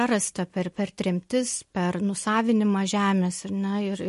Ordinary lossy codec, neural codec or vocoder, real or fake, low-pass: MP3, 48 kbps; none; real; 14.4 kHz